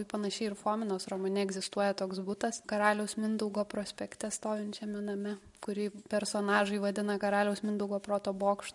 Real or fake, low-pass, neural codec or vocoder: real; 10.8 kHz; none